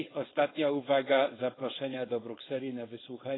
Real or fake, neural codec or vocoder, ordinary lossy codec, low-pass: fake; codec, 16 kHz, 4.8 kbps, FACodec; AAC, 16 kbps; 7.2 kHz